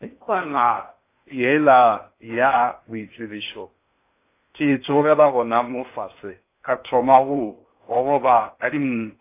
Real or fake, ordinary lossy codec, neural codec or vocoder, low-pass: fake; AAC, 24 kbps; codec, 16 kHz in and 24 kHz out, 0.6 kbps, FocalCodec, streaming, 4096 codes; 3.6 kHz